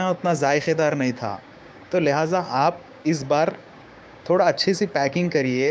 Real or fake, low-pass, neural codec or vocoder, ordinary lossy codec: fake; none; codec, 16 kHz, 6 kbps, DAC; none